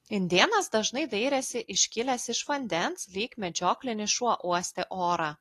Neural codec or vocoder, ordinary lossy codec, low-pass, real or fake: none; AAC, 48 kbps; 14.4 kHz; real